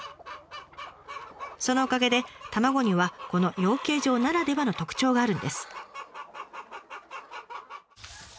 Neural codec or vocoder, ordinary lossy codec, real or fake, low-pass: none; none; real; none